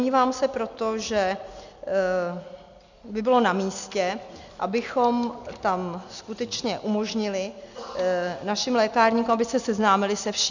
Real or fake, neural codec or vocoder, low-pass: real; none; 7.2 kHz